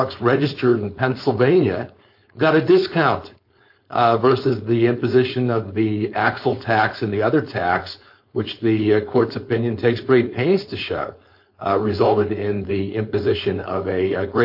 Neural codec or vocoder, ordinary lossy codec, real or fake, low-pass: codec, 16 kHz, 4.8 kbps, FACodec; MP3, 32 kbps; fake; 5.4 kHz